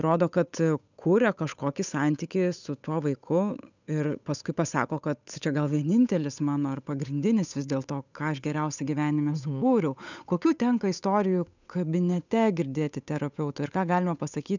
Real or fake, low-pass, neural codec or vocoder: fake; 7.2 kHz; codec, 16 kHz, 8 kbps, FunCodec, trained on Chinese and English, 25 frames a second